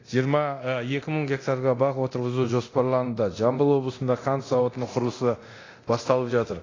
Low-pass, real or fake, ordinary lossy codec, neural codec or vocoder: 7.2 kHz; fake; AAC, 32 kbps; codec, 24 kHz, 0.9 kbps, DualCodec